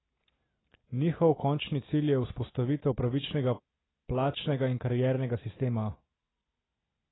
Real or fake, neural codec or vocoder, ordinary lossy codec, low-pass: real; none; AAC, 16 kbps; 7.2 kHz